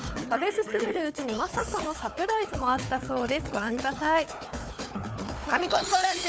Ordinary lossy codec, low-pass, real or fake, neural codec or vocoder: none; none; fake; codec, 16 kHz, 4 kbps, FunCodec, trained on Chinese and English, 50 frames a second